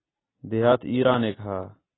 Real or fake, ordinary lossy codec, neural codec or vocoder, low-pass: real; AAC, 16 kbps; none; 7.2 kHz